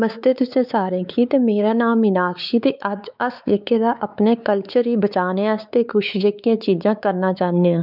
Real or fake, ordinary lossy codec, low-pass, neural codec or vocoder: fake; none; 5.4 kHz; codec, 16 kHz, 4 kbps, X-Codec, WavLM features, trained on Multilingual LibriSpeech